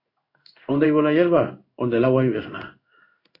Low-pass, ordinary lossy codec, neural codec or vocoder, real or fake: 5.4 kHz; MP3, 48 kbps; codec, 16 kHz in and 24 kHz out, 1 kbps, XY-Tokenizer; fake